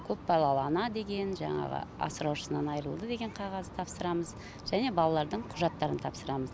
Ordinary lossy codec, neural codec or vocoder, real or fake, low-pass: none; none; real; none